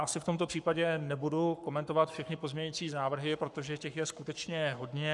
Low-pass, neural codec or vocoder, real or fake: 10.8 kHz; codec, 44.1 kHz, 7.8 kbps, Pupu-Codec; fake